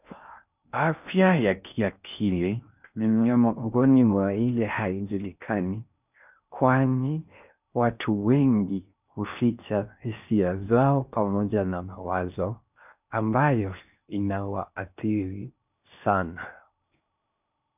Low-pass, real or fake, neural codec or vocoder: 3.6 kHz; fake; codec, 16 kHz in and 24 kHz out, 0.6 kbps, FocalCodec, streaming, 2048 codes